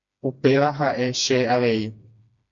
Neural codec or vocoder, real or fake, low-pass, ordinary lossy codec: codec, 16 kHz, 2 kbps, FreqCodec, smaller model; fake; 7.2 kHz; MP3, 48 kbps